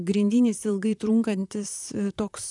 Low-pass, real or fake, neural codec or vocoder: 10.8 kHz; fake; vocoder, 44.1 kHz, 128 mel bands, Pupu-Vocoder